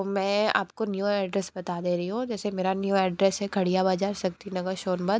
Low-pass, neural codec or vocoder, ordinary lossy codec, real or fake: none; none; none; real